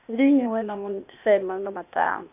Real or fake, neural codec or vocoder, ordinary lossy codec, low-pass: fake; codec, 16 kHz, 0.8 kbps, ZipCodec; none; 3.6 kHz